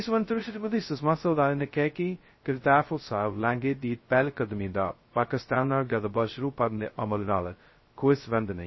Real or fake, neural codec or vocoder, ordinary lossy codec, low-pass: fake; codec, 16 kHz, 0.2 kbps, FocalCodec; MP3, 24 kbps; 7.2 kHz